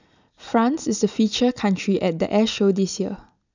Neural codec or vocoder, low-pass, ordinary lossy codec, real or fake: none; 7.2 kHz; none; real